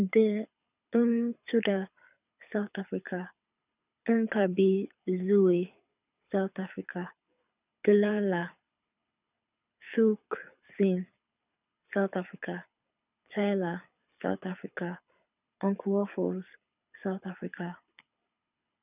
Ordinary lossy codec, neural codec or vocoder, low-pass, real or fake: none; codec, 24 kHz, 6 kbps, HILCodec; 3.6 kHz; fake